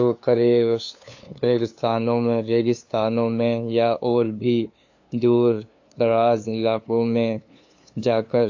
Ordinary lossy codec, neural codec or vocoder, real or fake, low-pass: AAC, 48 kbps; codec, 16 kHz, 2 kbps, FunCodec, trained on LibriTTS, 25 frames a second; fake; 7.2 kHz